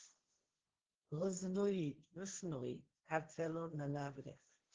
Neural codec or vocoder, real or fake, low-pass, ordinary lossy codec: codec, 16 kHz, 1.1 kbps, Voila-Tokenizer; fake; 7.2 kHz; Opus, 16 kbps